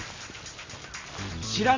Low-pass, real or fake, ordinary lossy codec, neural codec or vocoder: 7.2 kHz; real; MP3, 64 kbps; none